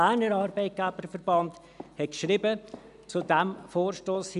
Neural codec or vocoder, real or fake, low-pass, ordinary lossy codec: none; real; 10.8 kHz; none